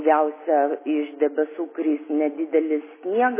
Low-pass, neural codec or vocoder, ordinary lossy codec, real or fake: 3.6 kHz; none; MP3, 16 kbps; real